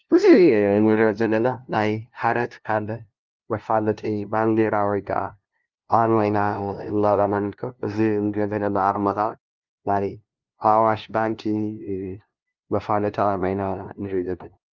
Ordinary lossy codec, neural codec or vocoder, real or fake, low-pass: Opus, 32 kbps; codec, 16 kHz, 0.5 kbps, FunCodec, trained on LibriTTS, 25 frames a second; fake; 7.2 kHz